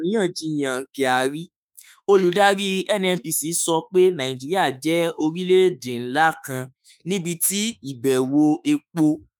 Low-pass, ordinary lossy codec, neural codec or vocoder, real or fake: none; none; autoencoder, 48 kHz, 32 numbers a frame, DAC-VAE, trained on Japanese speech; fake